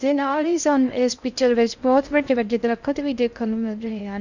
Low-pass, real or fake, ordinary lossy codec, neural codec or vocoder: 7.2 kHz; fake; none; codec, 16 kHz in and 24 kHz out, 0.6 kbps, FocalCodec, streaming, 2048 codes